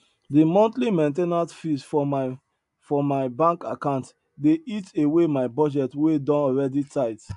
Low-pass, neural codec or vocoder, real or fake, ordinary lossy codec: 10.8 kHz; none; real; AAC, 96 kbps